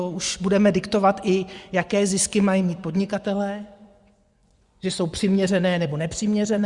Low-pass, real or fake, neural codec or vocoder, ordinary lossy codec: 10.8 kHz; fake; vocoder, 24 kHz, 100 mel bands, Vocos; Opus, 64 kbps